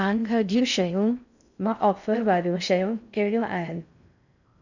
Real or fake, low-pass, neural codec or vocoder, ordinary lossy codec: fake; 7.2 kHz; codec, 16 kHz in and 24 kHz out, 0.6 kbps, FocalCodec, streaming, 4096 codes; none